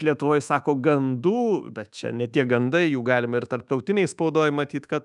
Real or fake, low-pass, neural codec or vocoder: fake; 10.8 kHz; codec, 24 kHz, 1.2 kbps, DualCodec